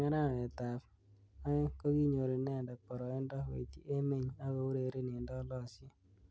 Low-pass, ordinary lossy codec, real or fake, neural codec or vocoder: none; none; real; none